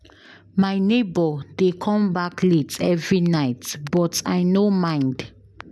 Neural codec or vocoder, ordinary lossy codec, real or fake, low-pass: none; none; real; none